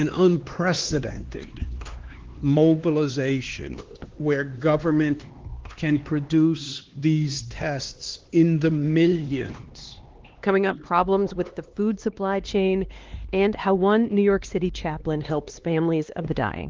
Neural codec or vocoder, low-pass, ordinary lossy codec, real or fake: codec, 16 kHz, 2 kbps, X-Codec, HuBERT features, trained on LibriSpeech; 7.2 kHz; Opus, 16 kbps; fake